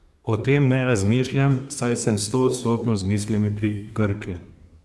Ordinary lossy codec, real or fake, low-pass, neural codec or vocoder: none; fake; none; codec, 24 kHz, 1 kbps, SNAC